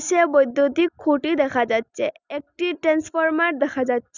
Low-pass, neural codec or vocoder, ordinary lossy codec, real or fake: 7.2 kHz; none; none; real